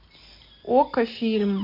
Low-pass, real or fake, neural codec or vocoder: 5.4 kHz; real; none